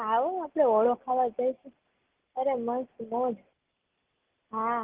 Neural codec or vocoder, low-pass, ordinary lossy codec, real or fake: none; 3.6 kHz; Opus, 16 kbps; real